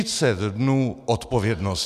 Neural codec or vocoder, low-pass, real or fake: none; 14.4 kHz; real